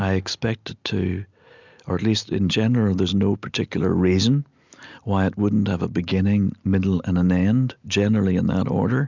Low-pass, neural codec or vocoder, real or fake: 7.2 kHz; none; real